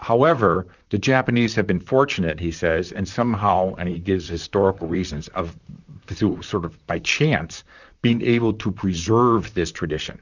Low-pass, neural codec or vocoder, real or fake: 7.2 kHz; vocoder, 44.1 kHz, 128 mel bands, Pupu-Vocoder; fake